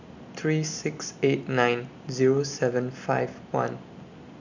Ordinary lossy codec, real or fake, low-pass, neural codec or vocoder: none; real; 7.2 kHz; none